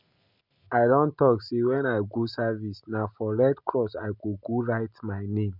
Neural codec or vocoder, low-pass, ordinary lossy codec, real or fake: none; 5.4 kHz; none; real